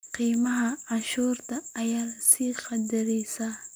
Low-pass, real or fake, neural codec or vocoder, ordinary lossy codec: none; real; none; none